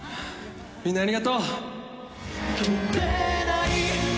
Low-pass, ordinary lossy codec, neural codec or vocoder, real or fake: none; none; none; real